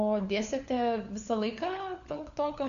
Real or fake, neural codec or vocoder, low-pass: fake; codec, 16 kHz, 4 kbps, FunCodec, trained on LibriTTS, 50 frames a second; 7.2 kHz